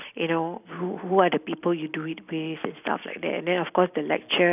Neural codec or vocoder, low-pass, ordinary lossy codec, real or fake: none; 3.6 kHz; none; real